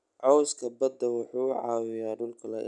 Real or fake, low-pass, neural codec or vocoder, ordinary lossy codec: real; 10.8 kHz; none; none